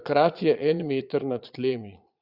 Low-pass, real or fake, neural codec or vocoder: 5.4 kHz; fake; vocoder, 22.05 kHz, 80 mel bands, Vocos